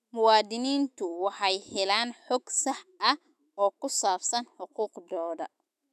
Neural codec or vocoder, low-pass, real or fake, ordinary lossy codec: autoencoder, 48 kHz, 128 numbers a frame, DAC-VAE, trained on Japanese speech; 14.4 kHz; fake; none